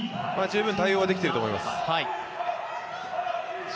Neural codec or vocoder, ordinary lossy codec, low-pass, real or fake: none; none; none; real